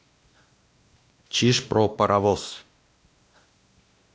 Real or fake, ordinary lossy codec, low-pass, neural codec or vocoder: fake; none; none; codec, 16 kHz, 1 kbps, X-Codec, WavLM features, trained on Multilingual LibriSpeech